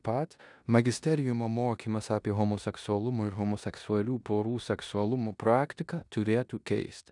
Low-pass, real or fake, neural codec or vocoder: 10.8 kHz; fake; codec, 16 kHz in and 24 kHz out, 0.9 kbps, LongCat-Audio-Codec, four codebook decoder